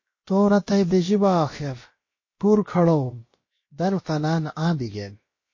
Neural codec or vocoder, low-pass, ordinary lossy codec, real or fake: codec, 16 kHz, about 1 kbps, DyCAST, with the encoder's durations; 7.2 kHz; MP3, 32 kbps; fake